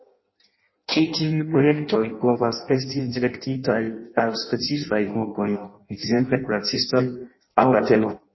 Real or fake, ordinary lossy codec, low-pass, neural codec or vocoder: fake; MP3, 24 kbps; 7.2 kHz; codec, 16 kHz in and 24 kHz out, 0.6 kbps, FireRedTTS-2 codec